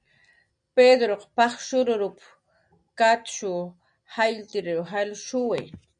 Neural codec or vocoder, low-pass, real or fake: none; 9.9 kHz; real